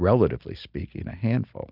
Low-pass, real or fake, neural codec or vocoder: 5.4 kHz; real; none